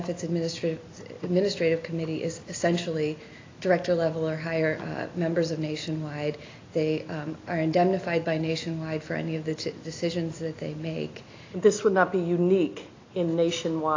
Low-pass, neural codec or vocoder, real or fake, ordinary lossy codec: 7.2 kHz; none; real; AAC, 32 kbps